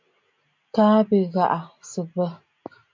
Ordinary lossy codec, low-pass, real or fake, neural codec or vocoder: MP3, 64 kbps; 7.2 kHz; real; none